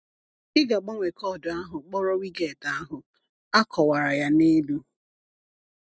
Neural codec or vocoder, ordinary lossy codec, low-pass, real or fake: none; none; none; real